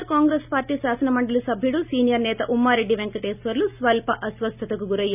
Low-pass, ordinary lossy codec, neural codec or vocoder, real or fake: 3.6 kHz; none; none; real